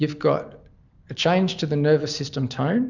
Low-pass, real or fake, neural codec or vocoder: 7.2 kHz; real; none